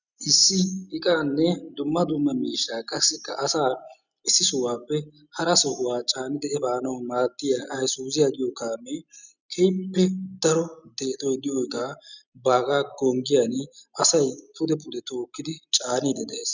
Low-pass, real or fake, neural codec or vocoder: 7.2 kHz; real; none